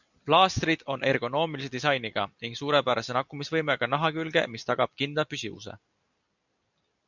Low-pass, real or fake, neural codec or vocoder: 7.2 kHz; real; none